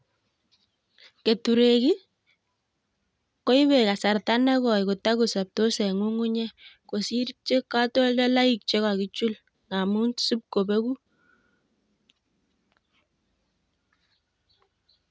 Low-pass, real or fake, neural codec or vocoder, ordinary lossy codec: none; real; none; none